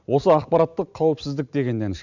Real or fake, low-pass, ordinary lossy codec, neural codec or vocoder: real; 7.2 kHz; none; none